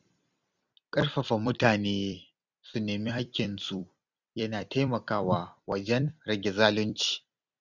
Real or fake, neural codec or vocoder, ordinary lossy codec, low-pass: fake; vocoder, 44.1 kHz, 128 mel bands every 256 samples, BigVGAN v2; none; 7.2 kHz